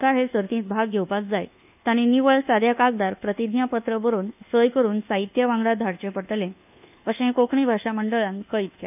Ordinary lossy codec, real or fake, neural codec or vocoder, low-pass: none; fake; codec, 24 kHz, 1.2 kbps, DualCodec; 3.6 kHz